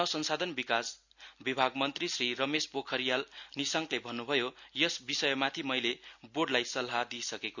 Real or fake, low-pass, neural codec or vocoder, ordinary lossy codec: real; 7.2 kHz; none; none